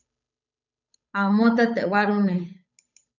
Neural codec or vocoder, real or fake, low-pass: codec, 16 kHz, 8 kbps, FunCodec, trained on Chinese and English, 25 frames a second; fake; 7.2 kHz